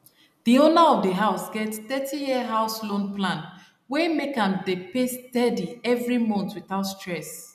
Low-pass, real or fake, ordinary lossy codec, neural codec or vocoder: 14.4 kHz; real; none; none